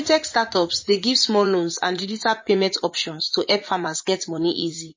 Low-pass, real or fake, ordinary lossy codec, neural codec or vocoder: 7.2 kHz; fake; MP3, 32 kbps; vocoder, 44.1 kHz, 80 mel bands, Vocos